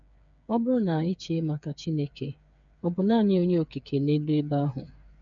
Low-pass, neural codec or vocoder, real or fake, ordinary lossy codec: 7.2 kHz; codec, 16 kHz, 8 kbps, FreqCodec, smaller model; fake; none